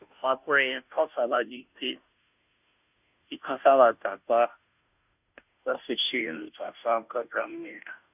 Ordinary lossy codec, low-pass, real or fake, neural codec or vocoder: none; 3.6 kHz; fake; codec, 16 kHz, 0.5 kbps, FunCodec, trained on Chinese and English, 25 frames a second